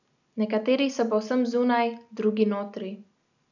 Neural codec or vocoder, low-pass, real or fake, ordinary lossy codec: none; 7.2 kHz; real; none